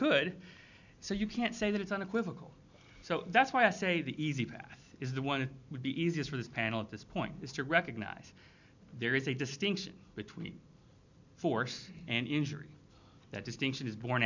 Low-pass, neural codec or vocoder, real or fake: 7.2 kHz; none; real